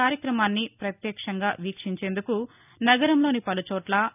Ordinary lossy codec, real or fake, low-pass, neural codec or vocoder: none; real; 3.6 kHz; none